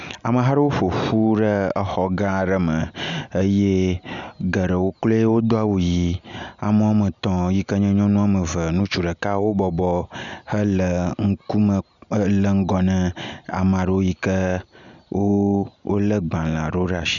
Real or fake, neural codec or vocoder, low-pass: real; none; 7.2 kHz